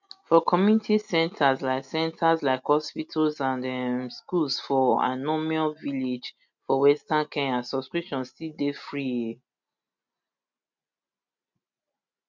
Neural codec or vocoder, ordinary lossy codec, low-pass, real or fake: none; none; 7.2 kHz; real